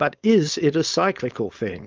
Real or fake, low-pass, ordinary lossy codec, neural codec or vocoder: fake; 7.2 kHz; Opus, 24 kbps; codec, 16 kHz, 4.8 kbps, FACodec